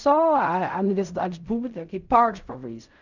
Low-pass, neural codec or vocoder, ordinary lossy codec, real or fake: 7.2 kHz; codec, 16 kHz in and 24 kHz out, 0.4 kbps, LongCat-Audio-Codec, fine tuned four codebook decoder; none; fake